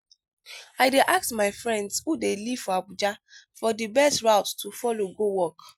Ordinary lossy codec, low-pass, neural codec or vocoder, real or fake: none; none; none; real